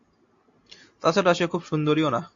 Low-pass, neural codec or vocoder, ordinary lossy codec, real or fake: 7.2 kHz; none; AAC, 48 kbps; real